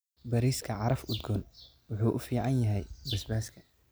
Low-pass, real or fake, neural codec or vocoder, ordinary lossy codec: none; real; none; none